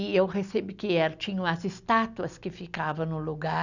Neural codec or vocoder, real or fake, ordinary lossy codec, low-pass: none; real; none; 7.2 kHz